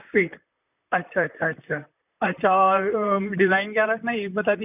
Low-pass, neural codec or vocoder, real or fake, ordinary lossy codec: 3.6 kHz; vocoder, 44.1 kHz, 128 mel bands, Pupu-Vocoder; fake; none